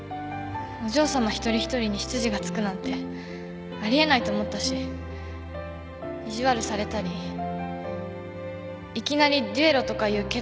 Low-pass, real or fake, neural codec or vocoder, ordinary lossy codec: none; real; none; none